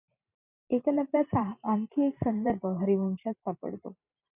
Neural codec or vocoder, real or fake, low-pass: vocoder, 44.1 kHz, 128 mel bands every 512 samples, BigVGAN v2; fake; 3.6 kHz